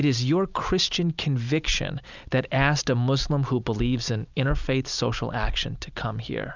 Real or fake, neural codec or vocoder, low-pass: real; none; 7.2 kHz